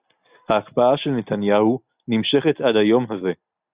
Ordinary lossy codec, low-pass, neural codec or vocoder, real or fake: Opus, 64 kbps; 3.6 kHz; none; real